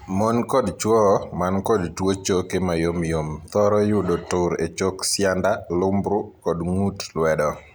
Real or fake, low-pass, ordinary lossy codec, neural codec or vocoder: real; none; none; none